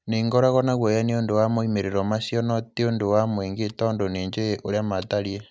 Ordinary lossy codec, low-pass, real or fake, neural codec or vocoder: none; none; real; none